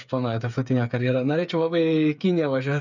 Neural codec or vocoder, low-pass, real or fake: codec, 16 kHz, 8 kbps, FreqCodec, smaller model; 7.2 kHz; fake